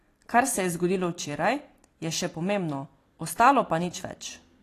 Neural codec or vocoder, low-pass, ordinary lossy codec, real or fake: vocoder, 44.1 kHz, 128 mel bands every 256 samples, BigVGAN v2; 14.4 kHz; AAC, 48 kbps; fake